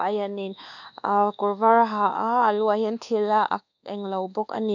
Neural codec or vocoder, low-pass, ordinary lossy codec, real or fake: codec, 24 kHz, 1.2 kbps, DualCodec; 7.2 kHz; none; fake